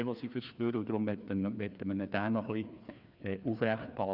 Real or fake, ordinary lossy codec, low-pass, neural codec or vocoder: fake; none; 5.4 kHz; codec, 16 kHz, 2 kbps, FreqCodec, larger model